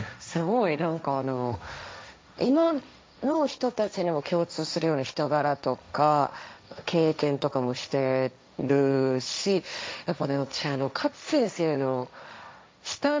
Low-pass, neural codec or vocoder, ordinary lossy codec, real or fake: none; codec, 16 kHz, 1.1 kbps, Voila-Tokenizer; none; fake